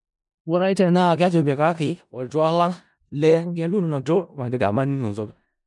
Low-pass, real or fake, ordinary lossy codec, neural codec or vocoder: 10.8 kHz; fake; none; codec, 16 kHz in and 24 kHz out, 0.4 kbps, LongCat-Audio-Codec, four codebook decoder